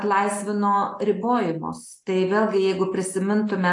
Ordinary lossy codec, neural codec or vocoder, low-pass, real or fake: AAC, 48 kbps; none; 10.8 kHz; real